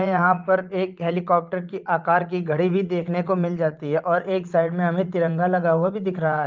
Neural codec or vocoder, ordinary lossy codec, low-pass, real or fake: vocoder, 44.1 kHz, 80 mel bands, Vocos; Opus, 32 kbps; 7.2 kHz; fake